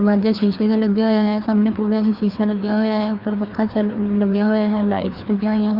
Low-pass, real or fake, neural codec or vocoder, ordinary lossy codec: 5.4 kHz; fake; codec, 16 kHz, 2 kbps, FreqCodec, larger model; Opus, 64 kbps